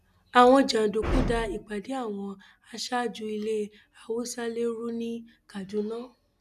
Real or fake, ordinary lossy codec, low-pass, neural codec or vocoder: real; none; 14.4 kHz; none